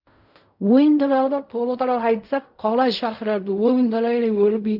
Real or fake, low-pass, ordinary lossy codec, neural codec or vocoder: fake; 5.4 kHz; none; codec, 16 kHz in and 24 kHz out, 0.4 kbps, LongCat-Audio-Codec, fine tuned four codebook decoder